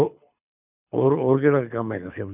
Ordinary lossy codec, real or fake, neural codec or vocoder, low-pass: none; fake; codec, 24 kHz, 6 kbps, HILCodec; 3.6 kHz